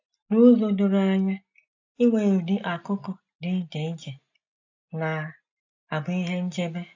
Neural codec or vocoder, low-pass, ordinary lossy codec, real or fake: none; 7.2 kHz; AAC, 48 kbps; real